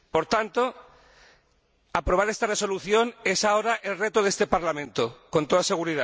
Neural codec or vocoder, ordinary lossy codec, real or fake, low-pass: none; none; real; none